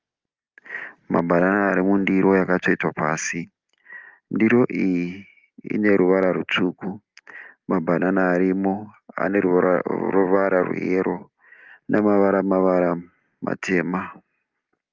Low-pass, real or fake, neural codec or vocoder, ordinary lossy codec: 7.2 kHz; real; none; Opus, 32 kbps